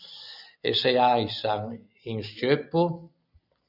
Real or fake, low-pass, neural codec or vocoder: real; 5.4 kHz; none